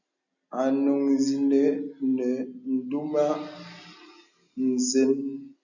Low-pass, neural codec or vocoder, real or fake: 7.2 kHz; none; real